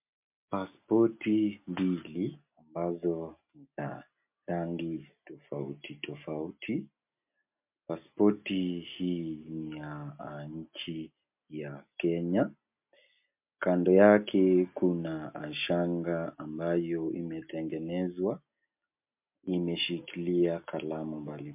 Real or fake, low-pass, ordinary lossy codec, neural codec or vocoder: real; 3.6 kHz; MP3, 32 kbps; none